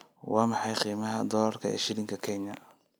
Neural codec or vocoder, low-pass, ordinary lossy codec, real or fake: none; none; none; real